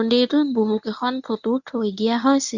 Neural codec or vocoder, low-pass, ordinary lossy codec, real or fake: codec, 24 kHz, 0.9 kbps, WavTokenizer, medium speech release version 1; 7.2 kHz; none; fake